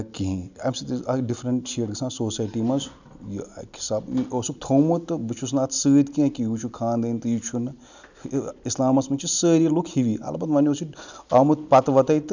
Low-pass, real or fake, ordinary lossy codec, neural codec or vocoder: 7.2 kHz; real; none; none